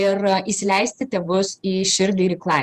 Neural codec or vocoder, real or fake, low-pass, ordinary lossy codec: vocoder, 48 kHz, 128 mel bands, Vocos; fake; 14.4 kHz; Opus, 64 kbps